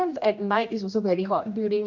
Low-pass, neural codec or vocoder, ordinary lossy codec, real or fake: 7.2 kHz; codec, 16 kHz, 1 kbps, X-Codec, HuBERT features, trained on general audio; none; fake